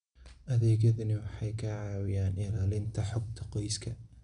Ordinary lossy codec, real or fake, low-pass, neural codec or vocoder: none; real; 9.9 kHz; none